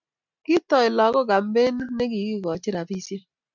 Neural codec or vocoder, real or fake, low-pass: none; real; 7.2 kHz